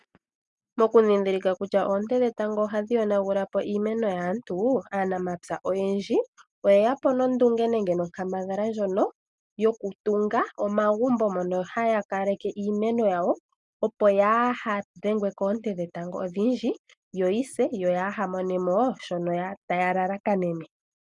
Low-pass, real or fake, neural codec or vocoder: 10.8 kHz; real; none